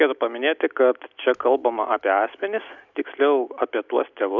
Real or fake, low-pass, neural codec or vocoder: real; 7.2 kHz; none